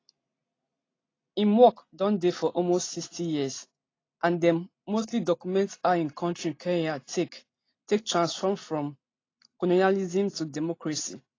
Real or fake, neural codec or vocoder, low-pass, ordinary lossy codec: real; none; 7.2 kHz; AAC, 32 kbps